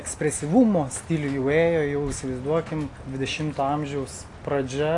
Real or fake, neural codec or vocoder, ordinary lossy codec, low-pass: real; none; AAC, 48 kbps; 10.8 kHz